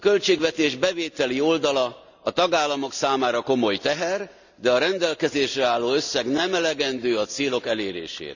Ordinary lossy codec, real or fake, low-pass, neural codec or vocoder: none; real; 7.2 kHz; none